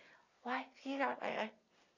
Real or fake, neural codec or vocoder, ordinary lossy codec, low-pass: real; none; none; 7.2 kHz